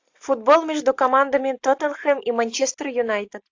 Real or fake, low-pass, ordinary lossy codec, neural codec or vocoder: real; 7.2 kHz; AAC, 48 kbps; none